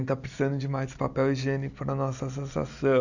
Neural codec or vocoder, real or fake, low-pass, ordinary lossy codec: none; real; 7.2 kHz; none